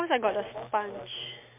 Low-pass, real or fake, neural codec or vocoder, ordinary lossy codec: 3.6 kHz; real; none; MP3, 32 kbps